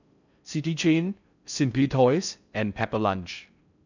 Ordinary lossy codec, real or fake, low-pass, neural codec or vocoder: none; fake; 7.2 kHz; codec, 16 kHz in and 24 kHz out, 0.6 kbps, FocalCodec, streaming, 4096 codes